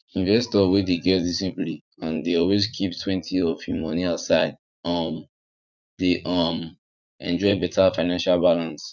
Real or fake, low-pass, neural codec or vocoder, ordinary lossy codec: fake; 7.2 kHz; vocoder, 44.1 kHz, 80 mel bands, Vocos; none